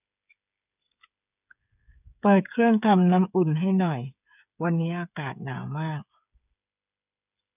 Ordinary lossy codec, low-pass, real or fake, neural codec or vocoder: none; 3.6 kHz; fake; codec, 16 kHz, 8 kbps, FreqCodec, smaller model